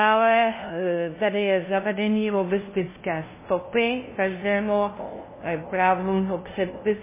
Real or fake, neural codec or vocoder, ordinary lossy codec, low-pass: fake; codec, 16 kHz, 0.5 kbps, FunCodec, trained on LibriTTS, 25 frames a second; MP3, 16 kbps; 3.6 kHz